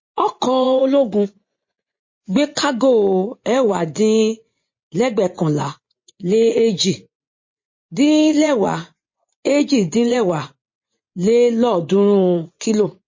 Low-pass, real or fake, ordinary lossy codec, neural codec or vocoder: 7.2 kHz; fake; MP3, 32 kbps; vocoder, 24 kHz, 100 mel bands, Vocos